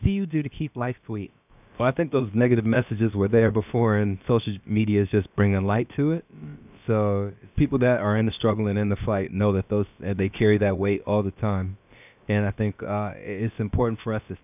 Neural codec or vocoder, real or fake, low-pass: codec, 16 kHz, about 1 kbps, DyCAST, with the encoder's durations; fake; 3.6 kHz